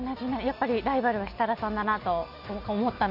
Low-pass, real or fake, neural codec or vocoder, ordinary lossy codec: 5.4 kHz; real; none; none